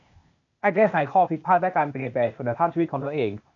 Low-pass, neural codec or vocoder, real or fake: 7.2 kHz; codec, 16 kHz, 0.8 kbps, ZipCodec; fake